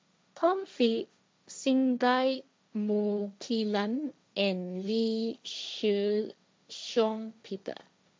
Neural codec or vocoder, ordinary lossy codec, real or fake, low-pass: codec, 16 kHz, 1.1 kbps, Voila-Tokenizer; none; fake; none